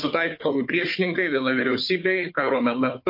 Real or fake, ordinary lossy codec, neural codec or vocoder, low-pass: fake; MP3, 32 kbps; codec, 16 kHz, 4 kbps, FunCodec, trained on Chinese and English, 50 frames a second; 5.4 kHz